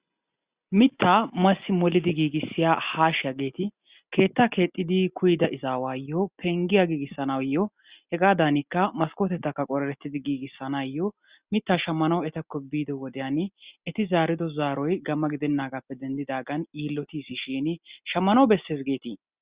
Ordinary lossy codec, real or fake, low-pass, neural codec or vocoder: Opus, 64 kbps; real; 3.6 kHz; none